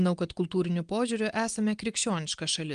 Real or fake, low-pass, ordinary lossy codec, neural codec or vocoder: real; 9.9 kHz; Opus, 24 kbps; none